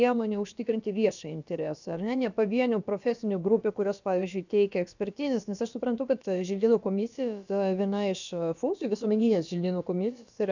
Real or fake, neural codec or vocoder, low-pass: fake; codec, 16 kHz, about 1 kbps, DyCAST, with the encoder's durations; 7.2 kHz